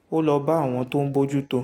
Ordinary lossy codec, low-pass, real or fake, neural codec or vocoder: AAC, 48 kbps; 19.8 kHz; real; none